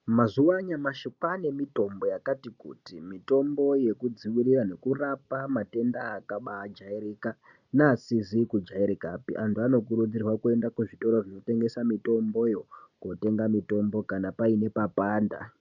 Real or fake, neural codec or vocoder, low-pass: real; none; 7.2 kHz